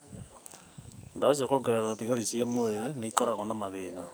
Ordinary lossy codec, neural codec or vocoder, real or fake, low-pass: none; codec, 44.1 kHz, 2.6 kbps, SNAC; fake; none